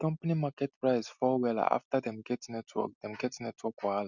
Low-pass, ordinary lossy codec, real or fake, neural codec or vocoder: 7.2 kHz; none; real; none